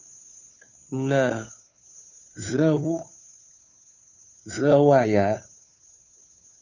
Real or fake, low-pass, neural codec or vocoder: fake; 7.2 kHz; codec, 16 kHz, 2 kbps, FunCodec, trained on Chinese and English, 25 frames a second